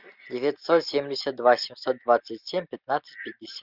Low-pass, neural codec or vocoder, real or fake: 5.4 kHz; none; real